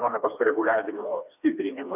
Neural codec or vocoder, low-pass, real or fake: codec, 16 kHz, 2 kbps, FreqCodec, smaller model; 3.6 kHz; fake